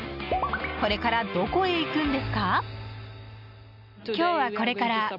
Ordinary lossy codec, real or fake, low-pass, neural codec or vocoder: none; real; 5.4 kHz; none